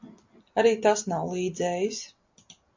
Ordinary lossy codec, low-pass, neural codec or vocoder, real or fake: MP3, 64 kbps; 7.2 kHz; none; real